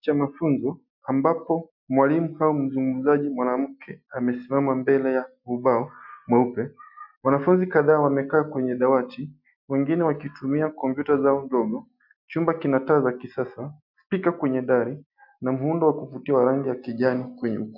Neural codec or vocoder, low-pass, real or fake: none; 5.4 kHz; real